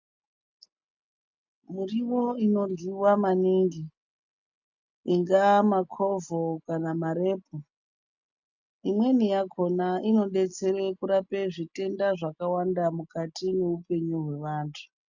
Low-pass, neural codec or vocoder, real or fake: 7.2 kHz; none; real